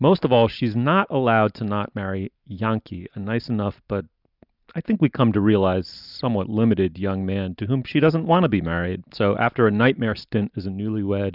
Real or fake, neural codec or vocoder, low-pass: real; none; 5.4 kHz